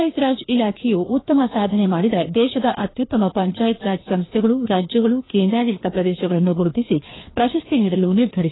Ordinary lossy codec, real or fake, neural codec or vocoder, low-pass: AAC, 16 kbps; fake; codec, 16 kHz, 2 kbps, FreqCodec, larger model; 7.2 kHz